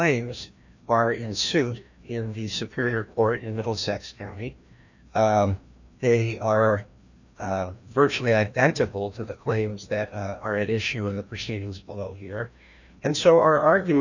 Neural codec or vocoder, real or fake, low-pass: codec, 16 kHz, 1 kbps, FreqCodec, larger model; fake; 7.2 kHz